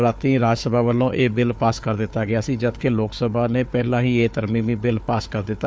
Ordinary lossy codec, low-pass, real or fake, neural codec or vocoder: none; none; fake; codec, 16 kHz, 4 kbps, FunCodec, trained on Chinese and English, 50 frames a second